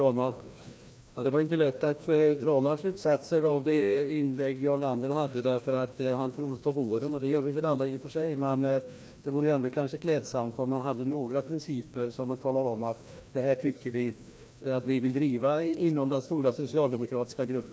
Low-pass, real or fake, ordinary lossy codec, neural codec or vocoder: none; fake; none; codec, 16 kHz, 1 kbps, FreqCodec, larger model